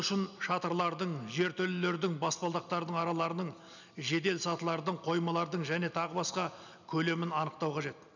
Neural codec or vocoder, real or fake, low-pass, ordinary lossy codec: none; real; 7.2 kHz; none